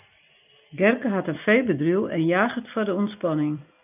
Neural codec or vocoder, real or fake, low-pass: none; real; 3.6 kHz